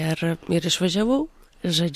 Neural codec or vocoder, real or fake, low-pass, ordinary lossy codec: none; real; 14.4 kHz; MP3, 64 kbps